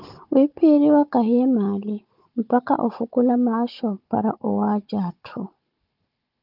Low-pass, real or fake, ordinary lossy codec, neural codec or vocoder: 5.4 kHz; fake; Opus, 32 kbps; codec, 16 kHz, 16 kbps, FunCodec, trained on Chinese and English, 50 frames a second